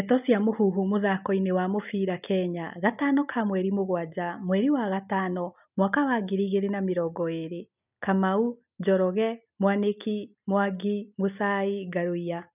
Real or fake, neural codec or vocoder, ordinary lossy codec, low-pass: real; none; none; 3.6 kHz